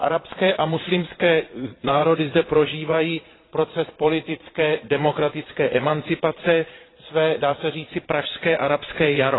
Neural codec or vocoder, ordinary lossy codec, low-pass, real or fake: vocoder, 22.05 kHz, 80 mel bands, Vocos; AAC, 16 kbps; 7.2 kHz; fake